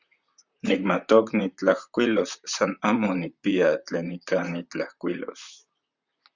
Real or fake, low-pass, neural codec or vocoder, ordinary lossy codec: fake; 7.2 kHz; vocoder, 44.1 kHz, 128 mel bands, Pupu-Vocoder; Opus, 64 kbps